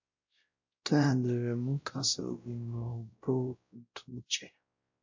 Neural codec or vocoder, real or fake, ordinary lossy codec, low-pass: codec, 16 kHz, 0.5 kbps, X-Codec, WavLM features, trained on Multilingual LibriSpeech; fake; MP3, 48 kbps; 7.2 kHz